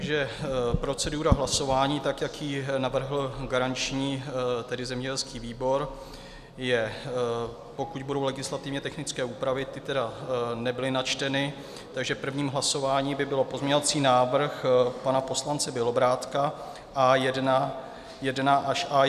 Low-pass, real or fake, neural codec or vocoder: 14.4 kHz; real; none